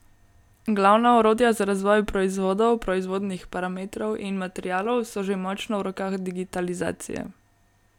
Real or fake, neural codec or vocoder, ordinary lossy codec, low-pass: real; none; none; 19.8 kHz